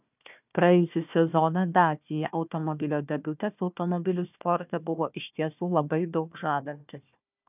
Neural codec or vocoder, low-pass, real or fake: codec, 16 kHz, 1 kbps, FunCodec, trained on Chinese and English, 50 frames a second; 3.6 kHz; fake